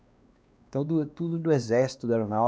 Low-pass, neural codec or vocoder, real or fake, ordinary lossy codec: none; codec, 16 kHz, 2 kbps, X-Codec, WavLM features, trained on Multilingual LibriSpeech; fake; none